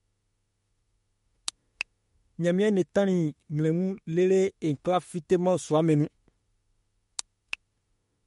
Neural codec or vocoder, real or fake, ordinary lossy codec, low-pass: autoencoder, 48 kHz, 32 numbers a frame, DAC-VAE, trained on Japanese speech; fake; MP3, 48 kbps; 19.8 kHz